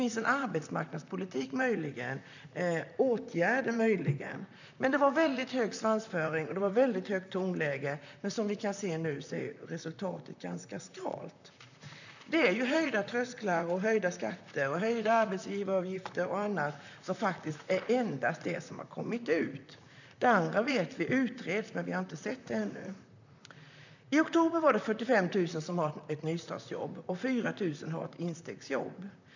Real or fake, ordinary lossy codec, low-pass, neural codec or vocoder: fake; none; 7.2 kHz; vocoder, 44.1 kHz, 128 mel bands, Pupu-Vocoder